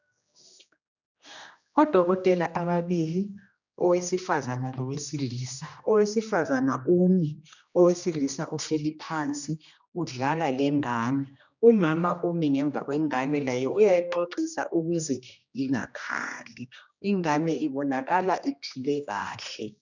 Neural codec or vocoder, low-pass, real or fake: codec, 16 kHz, 1 kbps, X-Codec, HuBERT features, trained on general audio; 7.2 kHz; fake